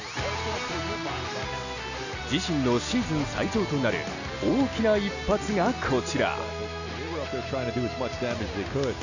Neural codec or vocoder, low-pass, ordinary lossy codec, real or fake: none; 7.2 kHz; none; real